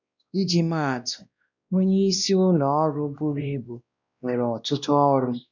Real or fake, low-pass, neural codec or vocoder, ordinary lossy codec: fake; 7.2 kHz; codec, 16 kHz, 1 kbps, X-Codec, WavLM features, trained on Multilingual LibriSpeech; none